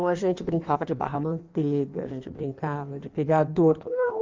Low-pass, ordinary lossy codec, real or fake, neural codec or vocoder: 7.2 kHz; Opus, 32 kbps; fake; codec, 16 kHz in and 24 kHz out, 1.1 kbps, FireRedTTS-2 codec